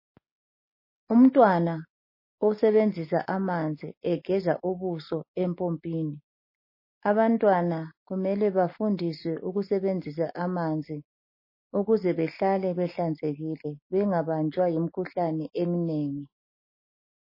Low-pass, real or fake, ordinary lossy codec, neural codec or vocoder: 5.4 kHz; real; MP3, 24 kbps; none